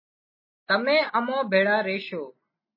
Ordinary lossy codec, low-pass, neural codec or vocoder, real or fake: MP3, 24 kbps; 5.4 kHz; none; real